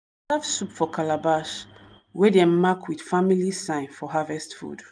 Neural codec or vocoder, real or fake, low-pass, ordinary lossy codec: none; real; 9.9 kHz; none